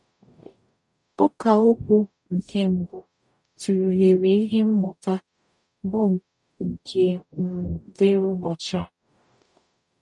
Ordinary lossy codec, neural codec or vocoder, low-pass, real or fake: none; codec, 44.1 kHz, 0.9 kbps, DAC; 10.8 kHz; fake